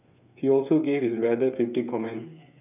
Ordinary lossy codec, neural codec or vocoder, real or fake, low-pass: none; codec, 16 kHz, 8 kbps, FreqCodec, smaller model; fake; 3.6 kHz